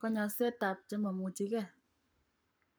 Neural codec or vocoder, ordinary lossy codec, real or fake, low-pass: codec, 44.1 kHz, 7.8 kbps, Pupu-Codec; none; fake; none